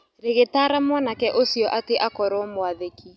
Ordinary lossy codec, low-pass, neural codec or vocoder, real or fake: none; none; none; real